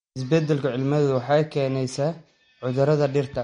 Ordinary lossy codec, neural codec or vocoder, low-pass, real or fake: MP3, 48 kbps; none; 9.9 kHz; real